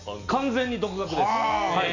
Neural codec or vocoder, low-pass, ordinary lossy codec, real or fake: none; 7.2 kHz; none; real